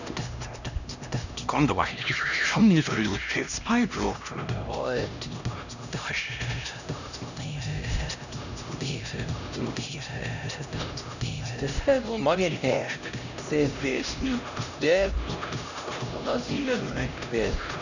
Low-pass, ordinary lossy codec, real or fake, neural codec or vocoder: 7.2 kHz; none; fake; codec, 16 kHz, 1 kbps, X-Codec, HuBERT features, trained on LibriSpeech